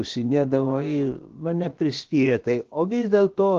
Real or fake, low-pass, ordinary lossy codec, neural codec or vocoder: fake; 7.2 kHz; Opus, 16 kbps; codec, 16 kHz, about 1 kbps, DyCAST, with the encoder's durations